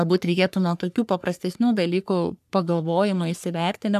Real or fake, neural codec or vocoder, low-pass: fake; codec, 44.1 kHz, 3.4 kbps, Pupu-Codec; 14.4 kHz